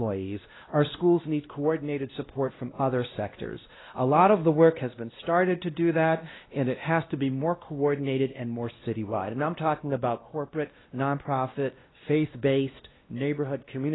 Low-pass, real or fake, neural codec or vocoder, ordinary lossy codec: 7.2 kHz; fake; codec, 16 kHz, 1 kbps, X-Codec, WavLM features, trained on Multilingual LibriSpeech; AAC, 16 kbps